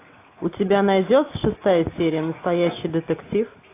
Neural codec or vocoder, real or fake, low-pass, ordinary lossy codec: none; real; 3.6 kHz; AAC, 24 kbps